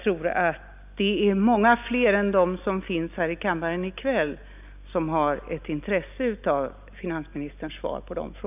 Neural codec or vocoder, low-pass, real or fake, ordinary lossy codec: none; 3.6 kHz; real; none